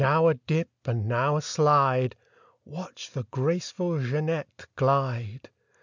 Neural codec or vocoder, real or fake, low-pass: none; real; 7.2 kHz